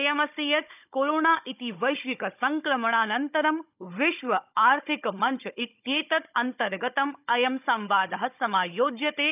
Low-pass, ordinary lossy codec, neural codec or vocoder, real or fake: 3.6 kHz; none; codec, 16 kHz, 16 kbps, FunCodec, trained on Chinese and English, 50 frames a second; fake